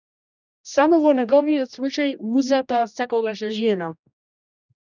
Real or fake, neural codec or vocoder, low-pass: fake; codec, 16 kHz, 1 kbps, X-Codec, HuBERT features, trained on general audio; 7.2 kHz